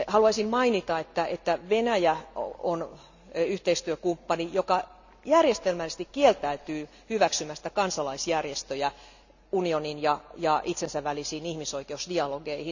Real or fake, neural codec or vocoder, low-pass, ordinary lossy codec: real; none; 7.2 kHz; none